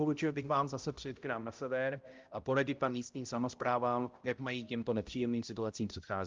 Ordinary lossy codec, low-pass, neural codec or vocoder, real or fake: Opus, 24 kbps; 7.2 kHz; codec, 16 kHz, 0.5 kbps, X-Codec, HuBERT features, trained on balanced general audio; fake